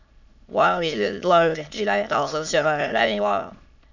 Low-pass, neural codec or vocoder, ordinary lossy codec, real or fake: 7.2 kHz; autoencoder, 22.05 kHz, a latent of 192 numbers a frame, VITS, trained on many speakers; none; fake